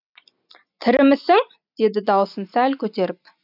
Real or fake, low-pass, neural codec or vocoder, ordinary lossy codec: real; 5.4 kHz; none; none